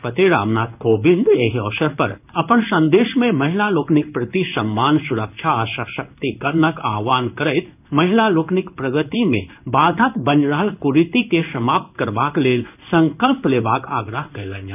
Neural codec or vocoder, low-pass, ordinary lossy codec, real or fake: codec, 16 kHz in and 24 kHz out, 1 kbps, XY-Tokenizer; 3.6 kHz; none; fake